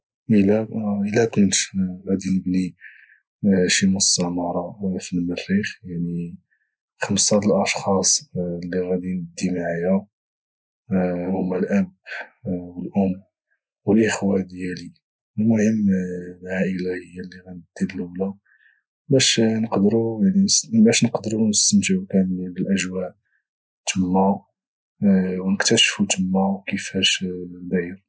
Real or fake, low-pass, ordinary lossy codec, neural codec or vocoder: real; none; none; none